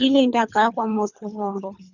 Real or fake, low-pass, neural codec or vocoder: fake; 7.2 kHz; codec, 24 kHz, 3 kbps, HILCodec